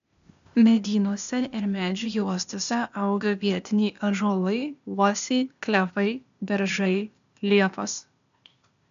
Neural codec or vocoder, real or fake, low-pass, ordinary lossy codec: codec, 16 kHz, 0.8 kbps, ZipCodec; fake; 7.2 kHz; AAC, 96 kbps